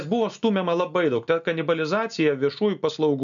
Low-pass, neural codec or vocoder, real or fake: 7.2 kHz; none; real